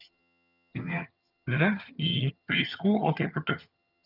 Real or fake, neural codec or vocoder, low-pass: fake; vocoder, 22.05 kHz, 80 mel bands, HiFi-GAN; 5.4 kHz